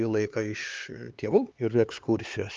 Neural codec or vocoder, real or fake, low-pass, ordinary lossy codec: codec, 16 kHz, 4 kbps, X-Codec, HuBERT features, trained on LibriSpeech; fake; 7.2 kHz; Opus, 24 kbps